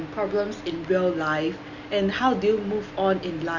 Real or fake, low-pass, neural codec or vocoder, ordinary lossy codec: real; 7.2 kHz; none; none